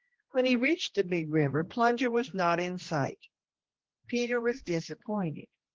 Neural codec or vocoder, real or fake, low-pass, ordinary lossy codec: codec, 16 kHz, 1 kbps, X-Codec, HuBERT features, trained on general audio; fake; 7.2 kHz; Opus, 16 kbps